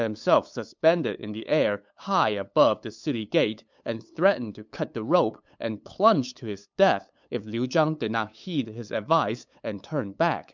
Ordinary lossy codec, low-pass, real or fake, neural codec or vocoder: MP3, 64 kbps; 7.2 kHz; fake; codec, 16 kHz, 8 kbps, FunCodec, trained on LibriTTS, 25 frames a second